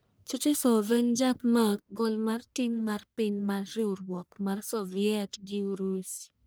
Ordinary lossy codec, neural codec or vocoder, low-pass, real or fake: none; codec, 44.1 kHz, 1.7 kbps, Pupu-Codec; none; fake